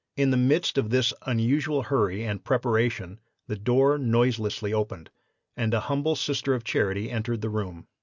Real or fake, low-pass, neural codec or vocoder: real; 7.2 kHz; none